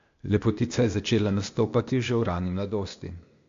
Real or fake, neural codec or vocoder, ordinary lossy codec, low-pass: fake; codec, 16 kHz, 0.8 kbps, ZipCodec; MP3, 48 kbps; 7.2 kHz